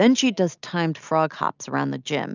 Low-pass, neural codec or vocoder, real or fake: 7.2 kHz; none; real